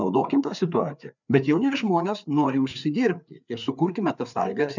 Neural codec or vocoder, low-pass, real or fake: codec, 16 kHz, 4 kbps, FreqCodec, larger model; 7.2 kHz; fake